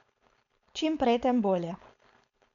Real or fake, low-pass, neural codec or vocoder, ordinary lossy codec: fake; 7.2 kHz; codec, 16 kHz, 4.8 kbps, FACodec; none